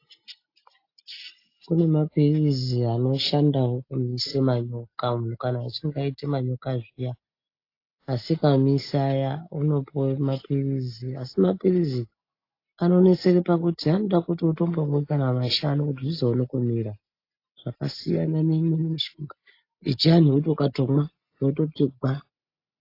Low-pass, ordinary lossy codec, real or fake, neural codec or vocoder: 5.4 kHz; AAC, 32 kbps; real; none